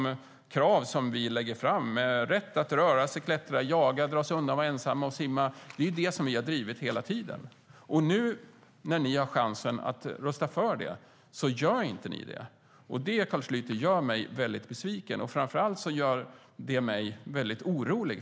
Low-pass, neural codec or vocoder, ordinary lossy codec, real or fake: none; none; none; real